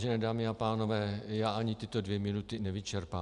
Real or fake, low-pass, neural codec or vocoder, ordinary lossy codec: real; 10.8 kHz; none; Opus, 64 kbps